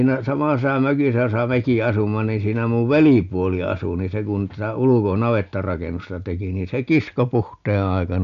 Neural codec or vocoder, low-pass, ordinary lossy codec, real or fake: none; 7.2 kHz; none; real